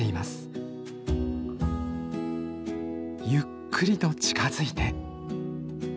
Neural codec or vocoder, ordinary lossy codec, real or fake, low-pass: none; none; real; none